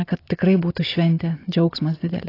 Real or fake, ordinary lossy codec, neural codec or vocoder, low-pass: real; AAC, 24 kbps; none; 5.4 kHz